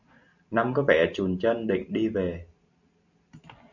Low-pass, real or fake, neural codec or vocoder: 7.2 kHz; real; none